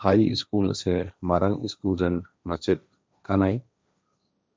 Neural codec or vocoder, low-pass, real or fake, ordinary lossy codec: codec, 16 kHz, 1.1 kbps, Voila-Tokenizer; none; fake; none